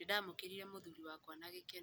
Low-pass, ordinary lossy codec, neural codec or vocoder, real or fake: none; none; none; real